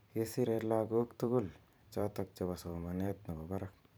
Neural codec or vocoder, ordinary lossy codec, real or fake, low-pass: vocoder, 44.1 kHz, 128 mel bands every 512 samples, BigVGAN v2; none; fake; none